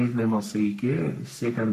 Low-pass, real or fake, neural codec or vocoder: 14.4 kHz; fake; codec, 44.1 kHz, 3.4 kbps, Pupu-Codec